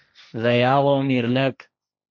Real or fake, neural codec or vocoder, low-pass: fake; codec, 16 kHz, 1.1 kbps, Voila-Tokenizer; 7.2 kHz